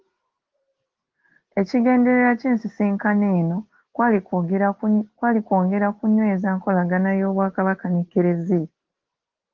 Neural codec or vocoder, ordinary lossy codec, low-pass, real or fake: none; Opus, 16 kbps; 7.2 kHz; real